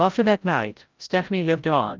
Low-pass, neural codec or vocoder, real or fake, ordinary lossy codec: 7.2 kHz; codec, 16 kHz, 0.5 kbps, FreqCodec, larger model; fake; Opus, 32 kbps